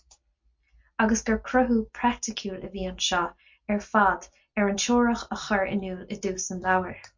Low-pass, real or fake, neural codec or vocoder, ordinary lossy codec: 7.2 kHz; real; none; MP3, 48 kbps